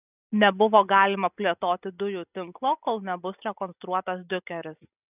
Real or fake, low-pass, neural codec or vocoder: real; 3.6 kHz; none